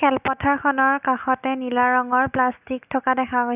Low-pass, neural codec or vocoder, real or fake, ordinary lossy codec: 3.6 kHz; none; real; none